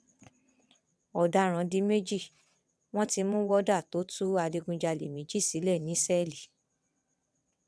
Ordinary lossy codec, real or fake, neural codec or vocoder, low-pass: none; fake; vocoder, 22.05 kHz, 80 mel bands, WaveNeXt; none